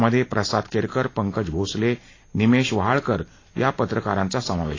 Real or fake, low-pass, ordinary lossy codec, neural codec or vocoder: real; 7.2 kHz; AAC, 32 kbps; none